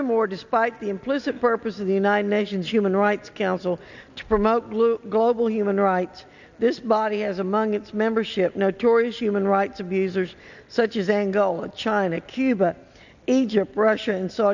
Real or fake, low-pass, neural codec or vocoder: real; 7.2 kHz; none